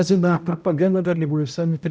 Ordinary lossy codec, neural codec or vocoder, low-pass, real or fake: none; codec, 16 kHz, 0.5 kbps, X-Codec, HuBERT features, trained on balanced general audio; none; fake